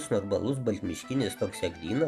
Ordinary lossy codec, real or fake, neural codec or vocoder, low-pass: Opus, 64 kbps; real; none; 14.4 kHz